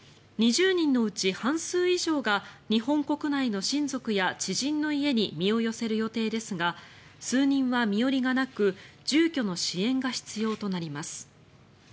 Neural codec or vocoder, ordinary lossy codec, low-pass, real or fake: none; none; none; real